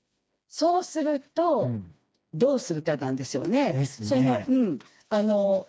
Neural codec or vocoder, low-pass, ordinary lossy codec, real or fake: codec, 16 kHz, 2 kbps, FreqCodec, smaller model; none; none; fake